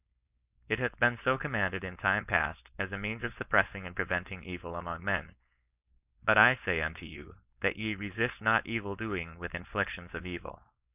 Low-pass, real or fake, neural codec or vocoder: 3.6 kHz; fake; codec, 16 kHz, 4.8 kbps, FACodec